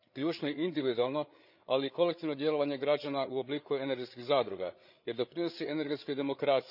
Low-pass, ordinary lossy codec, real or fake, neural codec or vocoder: 5.4 kHz; none; fake; codec, 16 kHz, 8 kbps, FreqCodec, larger model